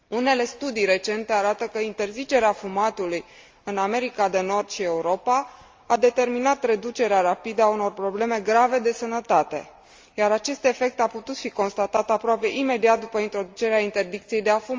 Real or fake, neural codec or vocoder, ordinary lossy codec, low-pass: real; none; Opus, 32 kbps; 7.2 kHz